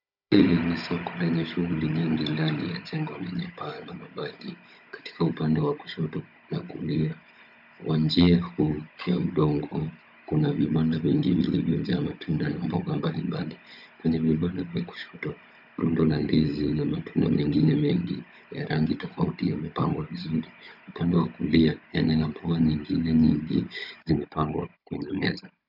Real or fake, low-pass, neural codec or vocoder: fake; 5.4 kHz; codec, 16 kHz, 16 kbps, FunCodec, trained on Chinese and English, 50 frames a second